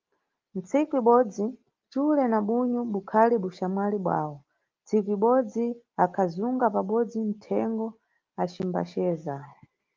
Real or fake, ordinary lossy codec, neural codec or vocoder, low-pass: real; Opus, 32 kbps; none; 7.2 kHz